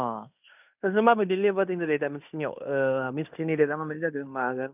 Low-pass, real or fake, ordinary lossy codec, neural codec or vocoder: 3.6 kHz; fake; none; codec, 16 kHz in and 24 kHz out, 0.9 kbps, LongCat-Audio-Codec, fine tuned four codebook decoder